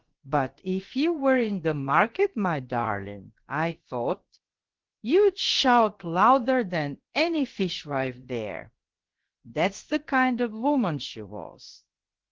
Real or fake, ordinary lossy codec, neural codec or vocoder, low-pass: fake; Opus, 16 kbps; codec, 16 kHz, about 1 kbps, DyCAST, with the encoder's durations; 7.2 kHz